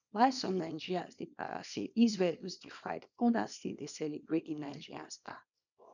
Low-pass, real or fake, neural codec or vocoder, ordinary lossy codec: 7.2 kHz; fake; codec, 24 kHz, 0.9 kbps, WavTokenizer, small release; none